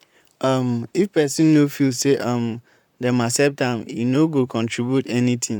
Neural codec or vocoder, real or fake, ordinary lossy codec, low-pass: vocoder, 44.1 kHz, 128 mel bands, Pupu-Vocoder; fake; none; 19.8 kHz